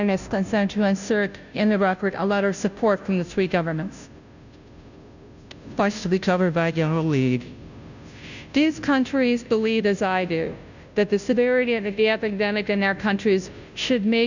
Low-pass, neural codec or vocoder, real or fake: 7.2 kHz; codec, 16 kHz, 0.5 kbps, FunCodec, trained on Chinese and English, 25 frames a second; fake